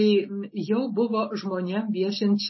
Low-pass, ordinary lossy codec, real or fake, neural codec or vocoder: 7.2 kHz; MP3, 24 kbps; real; none